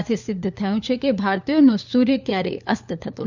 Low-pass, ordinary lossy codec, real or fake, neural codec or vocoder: 7.2 kHz; none; fake; codec, 16 kHz, 4 kbps, FunCodec, trained on LibriTTS, 50 frames a second